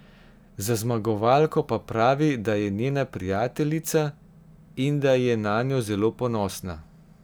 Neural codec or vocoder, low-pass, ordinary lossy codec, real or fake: none; none; none; real